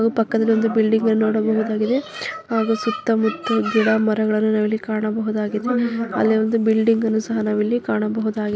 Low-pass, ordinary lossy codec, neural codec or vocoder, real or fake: none; none; none; real